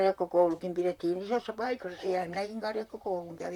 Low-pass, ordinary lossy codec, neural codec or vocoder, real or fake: 19.8 kHz; none; vocoder, 44.1 kHz, 128 mel bands, Pupu-Vocoder; fake